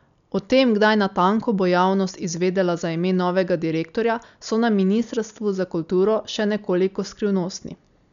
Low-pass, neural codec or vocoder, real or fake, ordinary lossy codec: 7.2 kHz; none; real; none